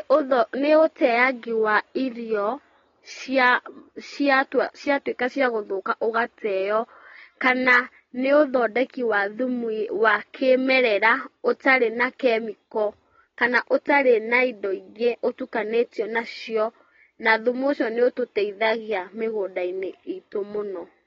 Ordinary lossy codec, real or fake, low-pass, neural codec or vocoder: AAC, 24 kbps; real; 7.2 kHz; none